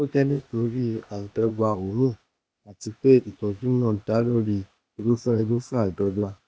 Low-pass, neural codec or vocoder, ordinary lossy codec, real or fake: none; codec, 16 kHz, 0.8 kbps, ZipCodec; none; fake